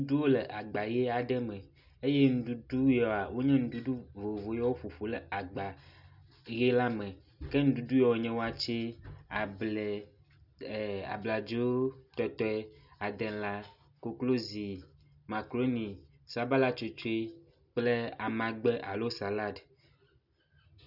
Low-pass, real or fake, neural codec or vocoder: 5.4 kHz; real; none